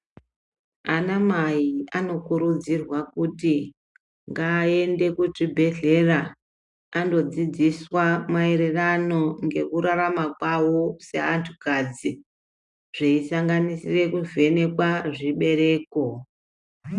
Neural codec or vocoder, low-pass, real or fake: none; 10.8 kHz; real